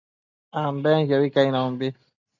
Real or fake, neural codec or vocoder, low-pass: real; none; 7.2 kHz